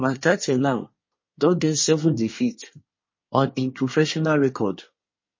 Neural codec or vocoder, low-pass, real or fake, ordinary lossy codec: codec, 24 kHz, 1 kbps, SNAC; 7.2 kHz; fake; MP3, 32 kbps